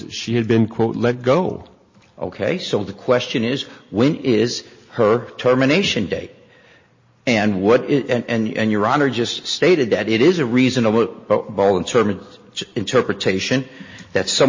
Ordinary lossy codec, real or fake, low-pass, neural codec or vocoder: MP3, 32 kbps; real; 7.2 kHz; none